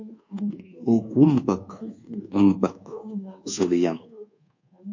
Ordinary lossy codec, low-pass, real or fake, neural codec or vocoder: MP3, 48 kbps; 7.2 kHz; fake; codec, 24 kHz, 1.2 kbps, DualCodec